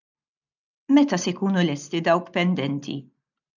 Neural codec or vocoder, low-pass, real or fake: none; 7.2 kHz; real